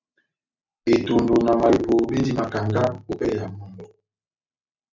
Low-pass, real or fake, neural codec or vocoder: 7.2 kHz; real; none